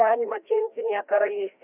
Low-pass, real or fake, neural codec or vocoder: 3.6 kHz; fake; codec, 16 kHz, 1 kbps, FreqCodec, larger model